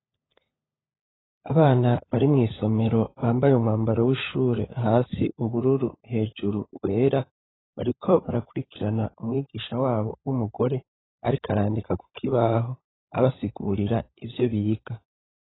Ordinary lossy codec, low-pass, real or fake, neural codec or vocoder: AAC, 16 kbps; 7.2 kHz; fake; codec, 16 kHz, 16 kbps, FunCodec, trained on LibriTTS, 50 frames a second